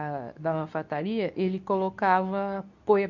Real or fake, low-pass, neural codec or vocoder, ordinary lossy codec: fake; 7.2 kHz; codec, 24 kHz, 0.9 kbps, WavTokenizer, medium speech release version 2; none